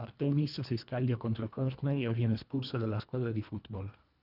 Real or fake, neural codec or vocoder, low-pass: fake; codec, 24 kHz, 1.5 kbps, HILCodec; 5.4 kHz